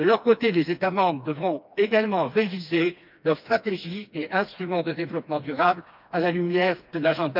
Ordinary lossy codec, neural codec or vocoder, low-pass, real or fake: none; codec, 16 kHz, 2 kbps, FreqCodec, smaller model; 5.4 kHz; fake